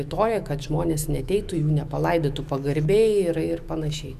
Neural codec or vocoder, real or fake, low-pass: autoencoder, 48 kHz, 128 numbers a frame, DAC-VAE, trained on Japanese speech; fake; 14.4 kHz